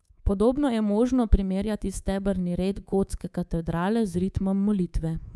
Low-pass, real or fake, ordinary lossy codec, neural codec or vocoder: none; fake; none; codec, 24 kHz, 3.1 kbps, DualCodec